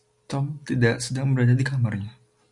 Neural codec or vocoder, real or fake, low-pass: none; real; 10.8 kHz